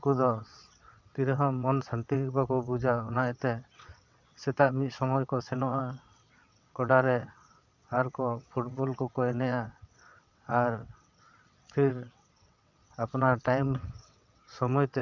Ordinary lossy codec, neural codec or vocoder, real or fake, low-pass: none; vocoder, 22.05 kHz, 80 mel bands, WaveNeXt; fake; 7.2 kHz